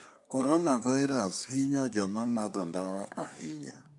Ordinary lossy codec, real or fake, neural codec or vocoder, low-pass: none; fake; codec, 24 kHz, 1 kbps, SNAC; 10.8 kHz